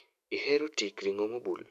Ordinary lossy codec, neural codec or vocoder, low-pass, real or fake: none; autoencoder, 48 kHz, 128 numbers a frame, DAC-VAE, trained on Japanese speech; 14.4 kHz; fake